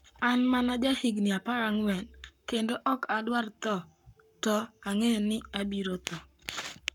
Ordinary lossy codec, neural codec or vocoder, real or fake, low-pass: none; codec, 44.1 kHz, 7.8 kbps, Pupu-Codec; fake; 19.8 kHz